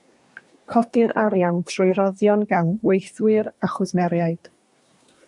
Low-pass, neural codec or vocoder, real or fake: 10.8 kHz; codec, 24 kHz, 1 kbps, SNAC; fake